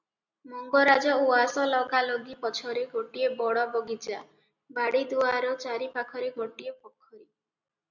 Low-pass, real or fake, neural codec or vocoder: 7.2 kHz; real; none